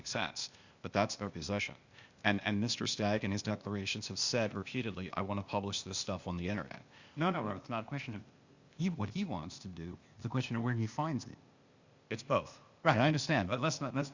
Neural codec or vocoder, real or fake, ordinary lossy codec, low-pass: codec, 16 kHz, 0.8 kbps, ZipCodec; fake; Opus, 64 kbps; 7.2 kHz